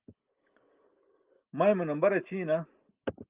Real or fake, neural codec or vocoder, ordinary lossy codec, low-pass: real; none; Opus, 24 kbps; 3.6 kHz